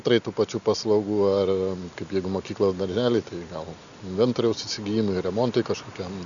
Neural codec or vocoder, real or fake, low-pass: none; real; 7.2 kHz